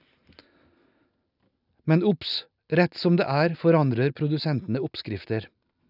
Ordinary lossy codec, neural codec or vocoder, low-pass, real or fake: none; none; 5.4 kHz; real